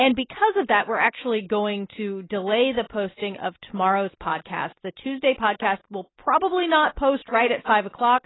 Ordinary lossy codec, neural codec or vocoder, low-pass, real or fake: AAC, 16 kbps; none; 7.2 kHz; real